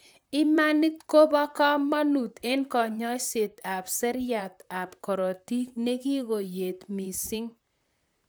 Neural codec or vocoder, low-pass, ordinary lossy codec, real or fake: vocoder, 44.1 kHz, 128 mel bands, Pupu-Vocoder; none; none; fake